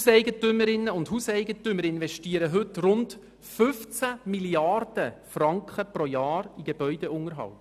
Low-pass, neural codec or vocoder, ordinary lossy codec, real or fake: 14.4 kHz; none; none; real